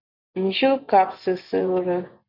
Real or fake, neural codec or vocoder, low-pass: fake; vocoder, 24 kHz, 100 mel bands, Vocos; 5.4 kHz